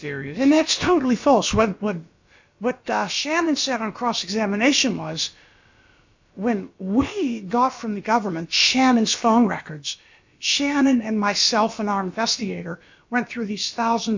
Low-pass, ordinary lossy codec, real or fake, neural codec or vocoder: 7.2 kHz; MP3, 64 kbps; fake; codec, 16 kHz, about 1 kbps, DyCAST, with the encoder's durations